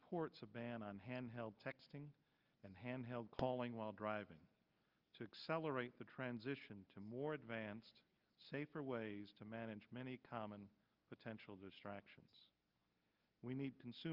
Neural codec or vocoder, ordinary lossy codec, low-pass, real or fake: none; Opus, 24 kbps; 5.4 kHz; real